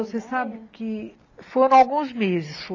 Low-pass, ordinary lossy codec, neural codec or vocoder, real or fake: 7.2 kHz; AAC, 32 kbps; none; real